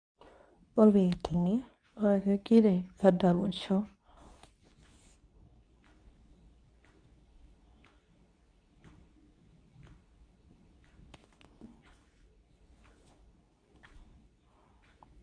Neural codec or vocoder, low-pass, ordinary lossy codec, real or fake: codec, 24 kHz, 0.9 kbps, WavTokenizer, medium speech release version 2; 9.9 kHz; none; fake